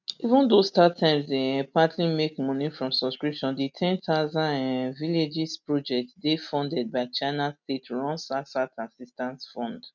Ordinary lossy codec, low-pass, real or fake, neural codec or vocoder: none; 7.2 kHz; real; none